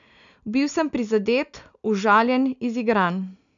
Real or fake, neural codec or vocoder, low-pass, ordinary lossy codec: real; none; 7.2 kHz; none